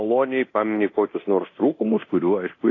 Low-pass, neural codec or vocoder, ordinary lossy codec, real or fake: 7.2 kHz; codec, 24 kHz, 0.9 kbps, DualCodec; AAC, 32 kbps; fake